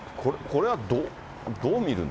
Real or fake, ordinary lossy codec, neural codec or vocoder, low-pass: real; none; none; none